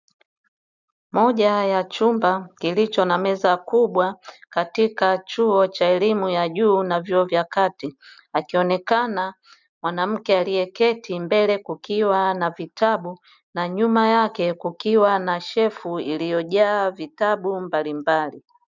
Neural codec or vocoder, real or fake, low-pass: none; real; 7.2 kHz